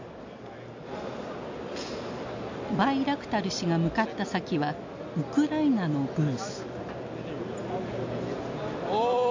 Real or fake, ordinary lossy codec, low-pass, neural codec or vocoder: real; MP3, 64 kbps; 7.2 kHz; none